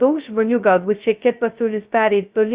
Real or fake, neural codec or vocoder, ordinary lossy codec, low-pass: fake; codec, 16 kHz, 0.2 kbps, FocalCodec; Opus, 64 kbps; 3.6 kHz